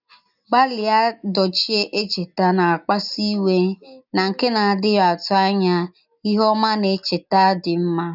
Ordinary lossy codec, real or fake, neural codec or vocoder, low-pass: none; real; none; 5.4 kHz